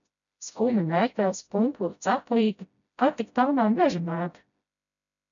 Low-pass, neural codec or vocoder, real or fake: 7.2 kHz; codec, 16 kHz, 0.5 kbps, FreqCodec, smaller model; fake